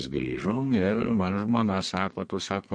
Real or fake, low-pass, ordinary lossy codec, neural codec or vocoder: fake; 9.9 kHz; MP3, 48 kbps; codec, 32 kHz, 1.9 kbps, SNAC